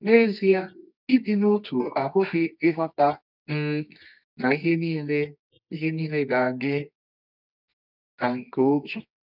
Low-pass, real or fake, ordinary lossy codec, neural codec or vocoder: 5.4 kHz; fake; none; codec, 24 kHz, 0.9 kbps, WavTokenizer, medium music audio release